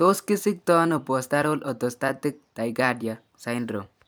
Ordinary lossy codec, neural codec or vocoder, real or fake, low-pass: none; vocoder, 44.1 kHz, 128 mel bands every 256 samples, BigVGAN v2; fake; none